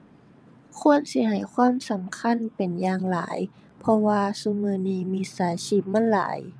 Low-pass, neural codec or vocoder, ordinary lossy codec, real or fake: none; vocoder, 22.05 kHz, 80 mel bands, WaveNeXt; none; fake